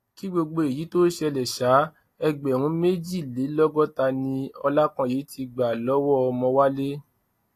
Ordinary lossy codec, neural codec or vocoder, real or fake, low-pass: AAC, 48 kbps; none; real; 14.4 kHz